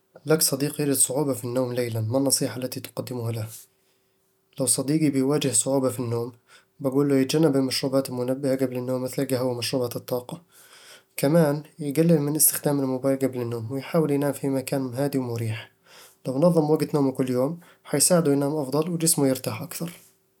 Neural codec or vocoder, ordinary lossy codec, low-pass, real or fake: none; none; 19.8 kHz; real